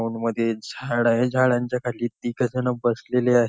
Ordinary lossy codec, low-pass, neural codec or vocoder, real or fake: none; none; none; real